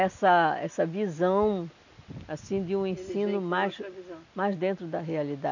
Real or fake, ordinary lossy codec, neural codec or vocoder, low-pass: real; none; none; 7.2 kHz